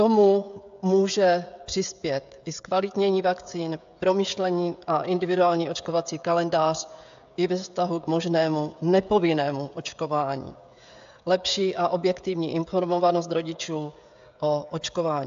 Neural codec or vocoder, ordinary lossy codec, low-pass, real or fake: codec, 16 kHz, 16 kbps, FreqCodec, smaller model; AAC, 64 kbps; 7.2 kHz; fake